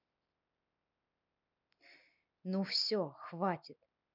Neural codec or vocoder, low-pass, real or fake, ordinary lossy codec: none; 5.4 kHz; real; none